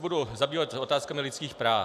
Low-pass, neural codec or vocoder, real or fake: 14.4 kHz; none; real